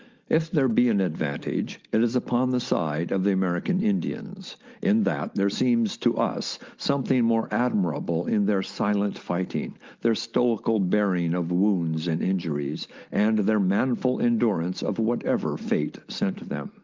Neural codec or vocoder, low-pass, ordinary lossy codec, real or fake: none; 7.2 kHz; Opus, 32 kbps; real